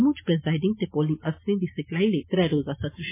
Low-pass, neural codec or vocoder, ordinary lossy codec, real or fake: 3.6 kHz; none; MP3, 16 kbps; real